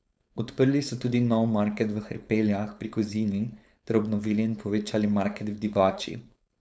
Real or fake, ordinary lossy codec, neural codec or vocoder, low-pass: fake; none; codec, 16 kHz, 4.8 kbps, FACodec; none